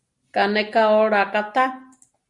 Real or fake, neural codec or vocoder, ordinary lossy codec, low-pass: real; none; Opus, 64 kbps; 10.8 kHz